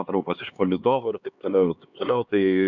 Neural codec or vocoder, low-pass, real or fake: codec, 16 kHz, 2 kbps, X-Codec, HuBERT features, trained on LibriSpeech; 7.2 kHz; fake